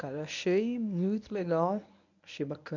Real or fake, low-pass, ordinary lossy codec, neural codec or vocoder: fake; 7.2 kHz; none; codec, 24 kHz, 0.9 kbps, WavTokenizer, medium speech release version 1